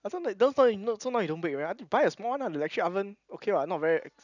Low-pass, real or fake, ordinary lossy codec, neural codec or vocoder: 7.2 kHz; real; none; none